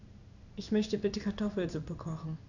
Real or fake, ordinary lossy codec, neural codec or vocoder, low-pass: fake; none; codec, 16 kHz in and 24 kHz out, 1 kbps, XY-Tokenizer; 7.2 kHz